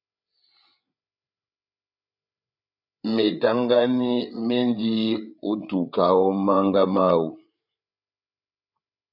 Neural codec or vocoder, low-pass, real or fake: codec, 16 kHz, 8 kbps, FreqCodec, larger model; 5.4 kHz; fake